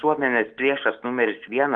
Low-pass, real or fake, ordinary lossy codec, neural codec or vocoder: 9.9 kHz; real; Opus, 32 kbps; none